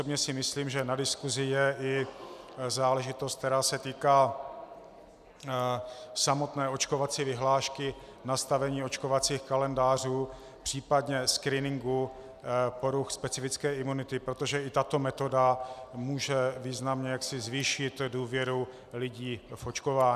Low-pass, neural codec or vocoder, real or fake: 14.4 kHz; none; real